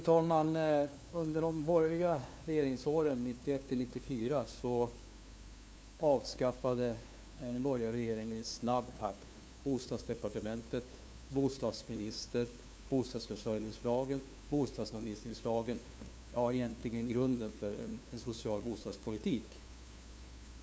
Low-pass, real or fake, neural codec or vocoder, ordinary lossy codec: none; fake; codec, 16 kHz, 2 kbps, FunCodec, trained on LibriTTS, 25 frames a second; none